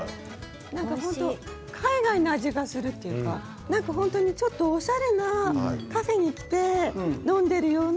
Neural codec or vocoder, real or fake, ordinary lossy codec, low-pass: none; real; none; none